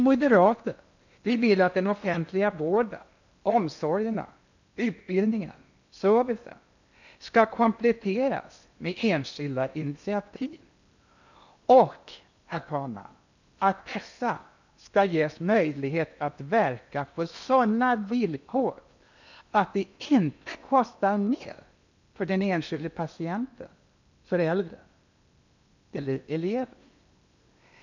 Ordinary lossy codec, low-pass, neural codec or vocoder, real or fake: none; 7.2 kHz; codec, 16 kHz in and 24 kHz out, 0.8 kbps, FocalCodec, streaming, 65536 codes; fake